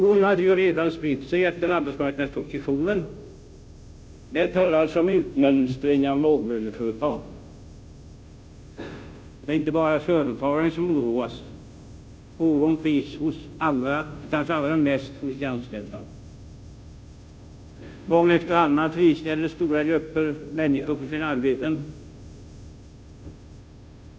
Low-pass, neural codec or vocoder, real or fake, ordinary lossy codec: none; codec, 16 kHz, 0.5 kbps, FunCodec, trained on Chinese and English, 25 frames a second; fake; none